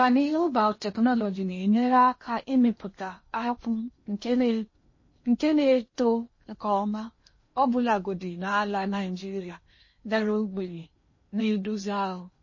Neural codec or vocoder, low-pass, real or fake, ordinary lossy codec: codec, 16 kHz in and 24 kHz out, 0.8 kbps, FocalCodec, streaming, 65536 codes; 7.2 kHz; fake; MP3, 32 kbps